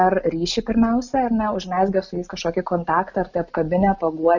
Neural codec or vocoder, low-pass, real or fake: none; 7.2 kHz; real